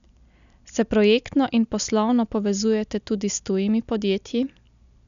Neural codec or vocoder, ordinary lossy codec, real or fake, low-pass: none; none; real; 7.2 kHz